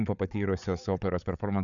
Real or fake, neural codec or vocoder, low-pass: fake; codec, 16 kHz, 8 kbps, FreqCodec, larger model; 7.2 kHz